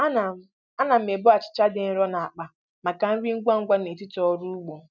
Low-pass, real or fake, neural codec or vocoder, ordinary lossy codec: 7.2 kHz; real; none; none